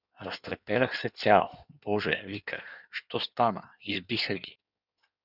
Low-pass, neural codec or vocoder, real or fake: 5.4 kHz; codec, 16 kHz in and 24 kHz out, 1.1 kbps, FireRedTTS-2 codec; fake